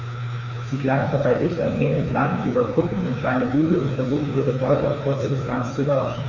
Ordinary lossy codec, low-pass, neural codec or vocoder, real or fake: none; 7.2 kHz; codec, 16 kHz, 2 kbps, FreqCodec, larger model; fake